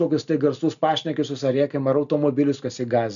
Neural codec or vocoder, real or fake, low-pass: none; real; 7.2 kHz